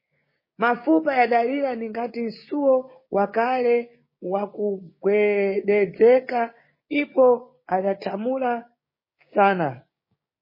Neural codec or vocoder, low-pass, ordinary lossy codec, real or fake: codec, 16 kHz, 6 kbps, DAC; 5.4 kHz; MP3, 24 kbps; fake